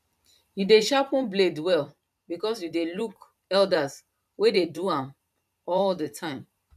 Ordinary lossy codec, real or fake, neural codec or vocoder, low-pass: none; fake; vocoder, 44.1 kHz, 128 mel bands every 512 samples, BigVGAN v2; 14.4 kHz